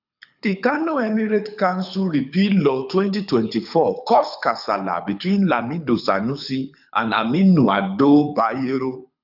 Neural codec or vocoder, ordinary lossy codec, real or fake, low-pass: codec, 24 kHz, 6 kbps, HILCodec; none; fake; 5.4 kHz